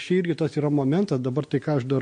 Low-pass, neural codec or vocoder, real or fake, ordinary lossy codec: 9.9 kHz; none; real; MP3, 48 kbps